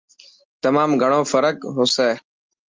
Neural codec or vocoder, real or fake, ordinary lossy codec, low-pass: none; real; Opus, 24 kbps; 7.2 kHz